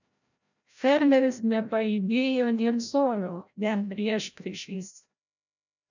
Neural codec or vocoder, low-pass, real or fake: codec, 16 kHz, 0.5 kbps, FreqCodec, larger model; 7.2 kHz; fake